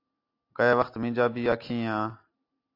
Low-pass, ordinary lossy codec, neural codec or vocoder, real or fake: 5.4 kHz; AAC, 32 kbps; none; real